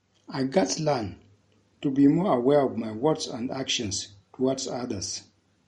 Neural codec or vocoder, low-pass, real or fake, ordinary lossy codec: none; 19.8 kHz; real; MP3, 48 kbps